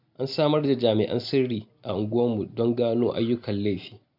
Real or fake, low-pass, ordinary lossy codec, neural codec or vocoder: real; 5.4 kHz; none; none